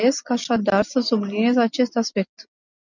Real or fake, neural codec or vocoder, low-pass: real; none; 7.2 kHz